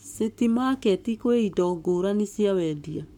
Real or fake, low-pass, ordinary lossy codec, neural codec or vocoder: fake; 19.8 kHz; MP3, 96 kbps; codec, 44.1 kHz, 7.8 kbps, Pupu-Codec